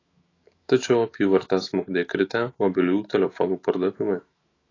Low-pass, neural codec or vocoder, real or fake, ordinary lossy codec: 7.2 kHz; autoencoder, 48 kHz, 128 numbers a frame, DAC-VAE, trained on Japanese speech; fake; AAC, 32 kbps